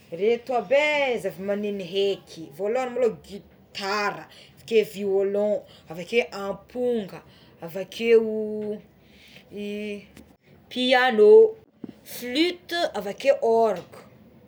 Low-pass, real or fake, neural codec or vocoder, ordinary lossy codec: none; real; none; none